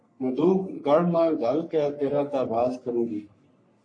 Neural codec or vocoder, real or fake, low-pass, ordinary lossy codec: codec, 44.1 kHz, 3.4 kbps, Pupu-Codec; fake; 9.9 kHz; AAC, 64 kbps